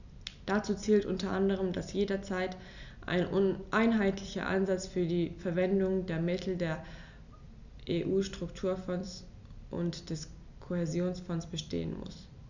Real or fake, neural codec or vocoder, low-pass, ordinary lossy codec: real; none; 7.2 kHz; none